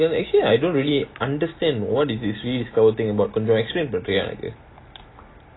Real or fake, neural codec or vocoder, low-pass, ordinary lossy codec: real; none; 7.2 kHz; AAC, 16 kbps